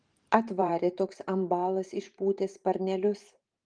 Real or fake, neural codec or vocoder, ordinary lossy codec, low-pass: fake; vocoder, 44.1 kHz, 128 mel bands every 512 samples, BigVGAN v2; Opus, 24 kbps; 9.9 kHz